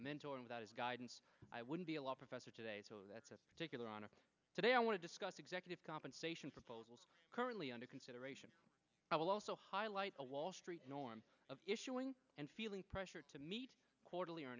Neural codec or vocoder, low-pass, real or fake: none; 7.2 kHz; real